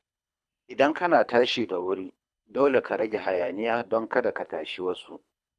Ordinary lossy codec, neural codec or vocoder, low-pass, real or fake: none; codec, 24 kHz, 3 kbps, HILCodec; none; fake